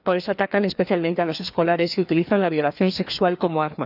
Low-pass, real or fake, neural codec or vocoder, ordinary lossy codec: 5.4 kHz; fake; codec, 16 kHz, 2 kbps, FreqCodec, larger model; none